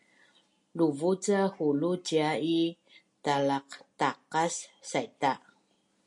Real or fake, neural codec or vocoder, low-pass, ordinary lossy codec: real; none; 10.8 kHz; MP3, 48 kbps